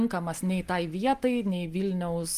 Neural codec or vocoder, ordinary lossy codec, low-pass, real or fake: none; Opus, 32 kbps; 14.4 kHz; real